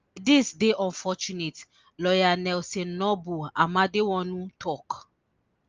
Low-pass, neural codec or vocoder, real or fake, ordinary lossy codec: 7.2 kHz; none; real; Opus, 24 kbps